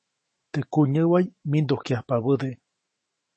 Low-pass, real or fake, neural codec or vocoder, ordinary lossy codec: 10.8 kHz; fake; autoencoder, 48 kHz, 128 numbers a frame, DAC-VAE, trained on Japanese speech; MP3, 32 kbps